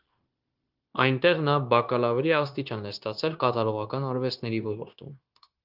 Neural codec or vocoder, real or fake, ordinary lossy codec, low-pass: codec, 16 kHz, 0.9 kbps, LongCat-Audio-Codec; fake; Opus, 24 kbps; 5.4 kHz